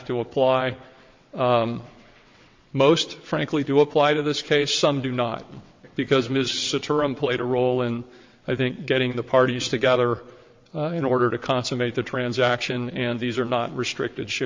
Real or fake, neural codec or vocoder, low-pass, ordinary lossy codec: fake; vocoder, 22.05 kHz, 80 mel bands, WaveNeXt; 7.2 kHz; MP3, 48 kbps